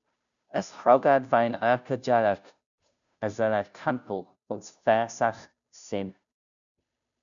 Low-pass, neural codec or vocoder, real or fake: 7.2 kHz; codec, 16 kHz, 0.5 kbps, FunCodec, trained on Chinese and English, 25 frames a second; fake